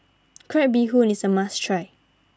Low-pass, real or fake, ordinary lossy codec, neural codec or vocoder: none; real; none; none